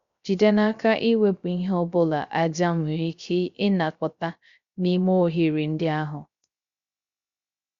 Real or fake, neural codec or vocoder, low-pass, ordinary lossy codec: fake; codec, 16 kHz, 0.3 kbps, FocalCodec; 7.2 kHz; Opus, 64 kbps